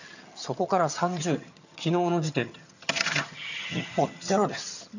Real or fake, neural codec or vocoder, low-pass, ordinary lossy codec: fake; vocoder, 22.05 kHz, 80 mel bands, HiFi-GAN; 7.2 kHz; none